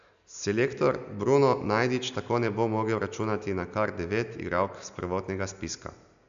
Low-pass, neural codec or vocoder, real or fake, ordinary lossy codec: 7.2 kHz; none; real; AAC, 96 kbps